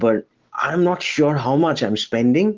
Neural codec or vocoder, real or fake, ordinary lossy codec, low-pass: none; real; Opus, 16 kbps; 7.2 kHz